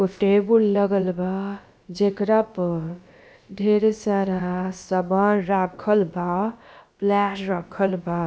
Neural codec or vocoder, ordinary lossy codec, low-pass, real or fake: codec, 16 kHz, about 1 kbps, DyCAST, with the encoder's durations; none; none; fake